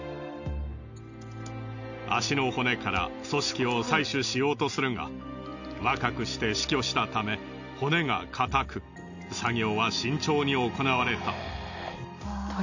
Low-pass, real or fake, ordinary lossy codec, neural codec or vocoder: 7.2 kHz; real; none; none